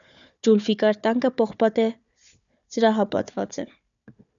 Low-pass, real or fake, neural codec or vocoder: 7.2 kHz; fake; codec, 16 kHz, 4 kbps, FunCodec, trained on Chinese and English, 50 frames a second